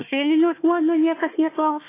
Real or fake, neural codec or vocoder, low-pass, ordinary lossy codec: fake; codec, 16 kHz, 1 kbps, FunCodec, trained on Chinese and English, 50 frames a second; 3.6 kHz; AAC, 16 kbps